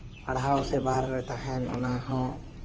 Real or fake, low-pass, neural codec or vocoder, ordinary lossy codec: fake; 7.2 kHz; codec, 16 kHz in and 24 kHz out, 2.2 kbps, FireRedTTS-2 codec; Opus, 24 kbps